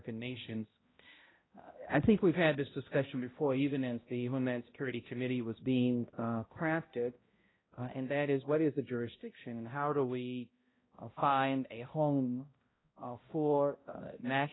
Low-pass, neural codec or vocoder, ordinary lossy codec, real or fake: 7.2 kHz; codec, 16 kHz, 0.5 kbps, X-Codec, HuBERT features, trained on balanced general audio; AAC, 16 kbps; fake